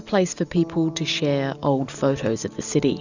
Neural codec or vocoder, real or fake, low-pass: none; real; 7.2 kHz